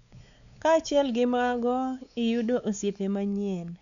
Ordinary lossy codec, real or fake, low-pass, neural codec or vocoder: none; fake; 7.2 kHz; codec, 16 kHz, 4 kbps, X-Codec, WavLM features, trained on Multilingual LibriSpeech